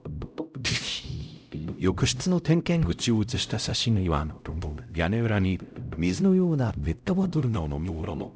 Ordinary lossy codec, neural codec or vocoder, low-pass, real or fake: none; codec, 16 kHz, 0.5 kbps, X-Codec, HuBERT features, trained on LibriSpeech; none; fake